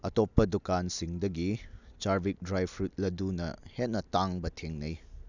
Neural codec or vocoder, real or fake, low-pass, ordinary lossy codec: none; real; 7.2 kHz; none